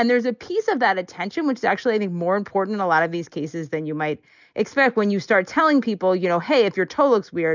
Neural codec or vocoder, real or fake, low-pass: none; real; 7.2 kHz